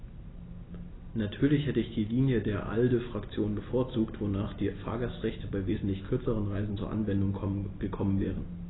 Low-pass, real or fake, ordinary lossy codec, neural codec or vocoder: 7.2 kHz; real; AAC, 16 kbps; none